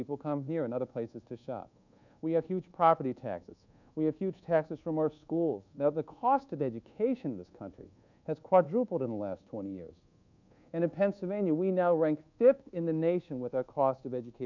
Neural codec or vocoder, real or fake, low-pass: codec, 24 kHz, 1.2 kbps, DualCodec; fake; 7.2 kHz